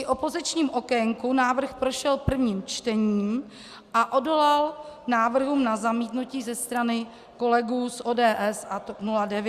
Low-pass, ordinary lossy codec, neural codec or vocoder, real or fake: 14.4 kHz; Opus, 64 kbps; none; real